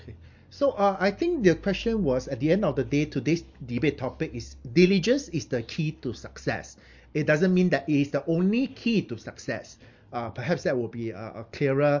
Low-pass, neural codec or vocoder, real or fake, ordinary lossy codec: 7.2 kHz; none; real; MP3, 48 kbps